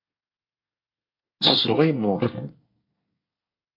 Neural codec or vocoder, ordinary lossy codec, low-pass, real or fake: codec, 24 kHz, 1 kbps, SNAC; MP3, 32 kbps; 5.4 kHz; fake